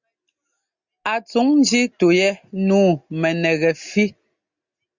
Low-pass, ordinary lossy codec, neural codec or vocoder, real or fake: 7.2 kHz; Opus, 64 kbps; none; real